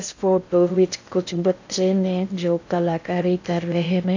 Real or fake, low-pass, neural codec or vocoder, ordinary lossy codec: fake; 7.2 kHz; codec, 16 kHz in and 24 kHz out, 0.6 kbps, FocalCodec, streaming, 2048 codes; AAC, 48 kbps